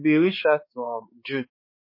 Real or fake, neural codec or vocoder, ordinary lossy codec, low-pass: fake; codec, 16 kHz, 4 kbps, X-Codec, HuBERT features, trained on balanced general audio; MP3, 24 kbps; 5.4 kHz